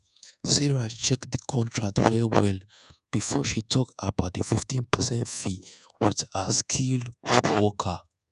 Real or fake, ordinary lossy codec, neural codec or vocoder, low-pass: fake; none; codec, 24 kHz, 1.2 kbps, DualCodec; 10.8 kHz